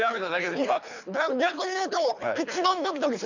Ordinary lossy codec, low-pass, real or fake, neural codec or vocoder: none; 7.2 kHz; fake; codec, 24 kHz, 3 kbps, HILCodec